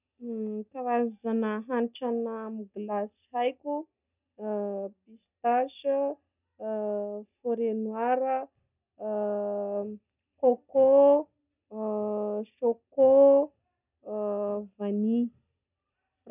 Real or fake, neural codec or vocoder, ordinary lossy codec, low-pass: real; none; none; 3.6 kHz